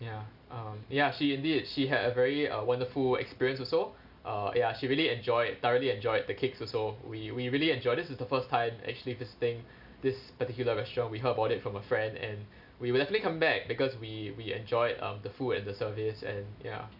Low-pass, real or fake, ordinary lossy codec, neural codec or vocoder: 5.4 kHz; real; none; none